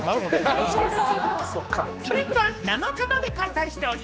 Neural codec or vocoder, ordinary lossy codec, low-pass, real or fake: codec, 16 kHz, 2 kbps, X-Codec, HuBERT features, trained on general audio; none; none; fake